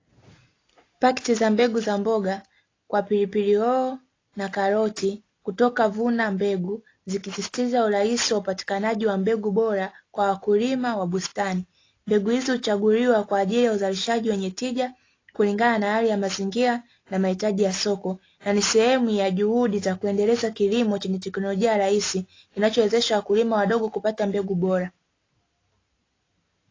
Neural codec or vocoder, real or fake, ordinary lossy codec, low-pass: none; real; AAC, 32 kbps; 7.2 kHz